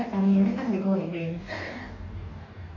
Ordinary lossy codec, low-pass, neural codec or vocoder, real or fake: none; 7.2 kHz; codec, 44.1 kHz, 2.6 kbps, DAC; fake